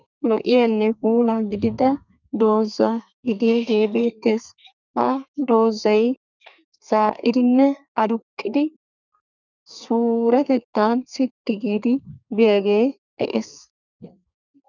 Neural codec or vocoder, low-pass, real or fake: codec, 32 kHz, 1.9 kbps, SNAC; 7.2 kHz; fake